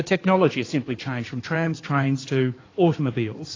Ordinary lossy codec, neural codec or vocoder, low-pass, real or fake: AAC, 32 kbps; codec, 16 kHz in and 24 kHz out, 2.2 kbps, FireRedTTS-2 codec; 7.2 kHz; fake